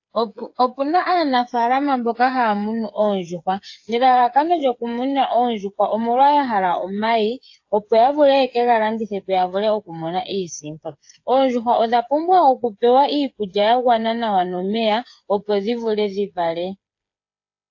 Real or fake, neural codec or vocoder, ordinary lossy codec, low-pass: fake; codec, 16 kHz, 8 kbps, FreqCodec, smaller model; AAC, 48 kbps; 7.2 kHz